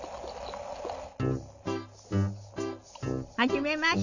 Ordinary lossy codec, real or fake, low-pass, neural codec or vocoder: none; real; 7.2 kHz; none